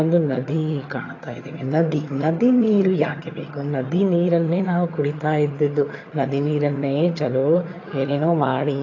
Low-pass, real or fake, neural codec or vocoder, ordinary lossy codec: 7.2 kHz; fake; vocoder, 22.05 kHz, 80 mel bands, HiFi-GAN; AAC, 32 kbps